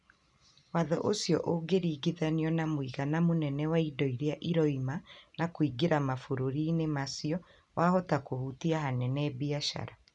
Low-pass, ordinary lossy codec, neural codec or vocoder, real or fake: 10.8 kHz; none; none; real